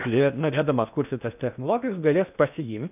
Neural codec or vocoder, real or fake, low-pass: codec, 16 kHz in and 24 kHz out, 0.6 kbps, FocalCodec, streaming, 2048 codes; fake; 3.6 kHz